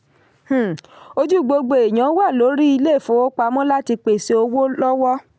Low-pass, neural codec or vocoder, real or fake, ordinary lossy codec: none; none; real; none